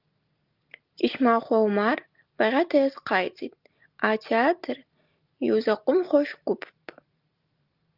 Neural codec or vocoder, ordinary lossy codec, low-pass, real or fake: none; Opus, 24 kbps; 5.4 kHz; real